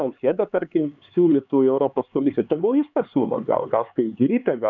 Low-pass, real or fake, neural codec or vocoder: 7.2 kHz; fake; codec, 16 kHz, 4 kbps, X-Codec, HuBERT features, trained on LibriSpeech